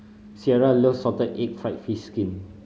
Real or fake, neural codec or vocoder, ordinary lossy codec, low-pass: real; none; none; none